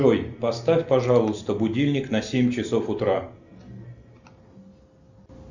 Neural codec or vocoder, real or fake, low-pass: none; real; 7.2 kHz